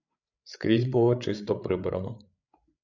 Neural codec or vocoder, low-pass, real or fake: codec, 16 kHz, 8 kbps, FreqCodec, larger model; 7.2 kHz; fake